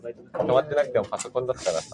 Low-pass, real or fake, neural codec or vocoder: 10.8 kHz; real; none